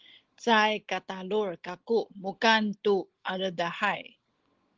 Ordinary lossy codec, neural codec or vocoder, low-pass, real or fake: Opus, 16 kbps; none; 7.2 kHz; real